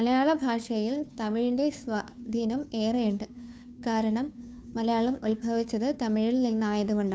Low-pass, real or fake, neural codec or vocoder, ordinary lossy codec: none; fake; codec, 16 kHz, 2 kbps, FunCodec, trained on Chinese and English, 25 frames a second; none